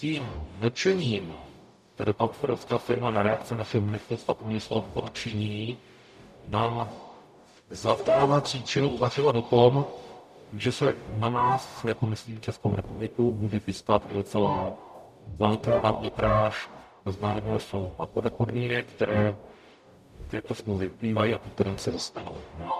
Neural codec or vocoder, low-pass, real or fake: codec, 44.1 kHz, 0.9 kbps, DAC; 14.4 kHz; fake